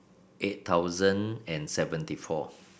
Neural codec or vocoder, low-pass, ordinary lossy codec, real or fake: none; none; none; real